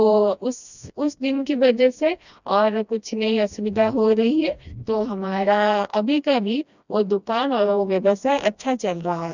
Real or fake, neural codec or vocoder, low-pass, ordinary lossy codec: fake; codec, 16 kHz, 1 kbps, FreqCodec, smaller model; 7.2 kHz; none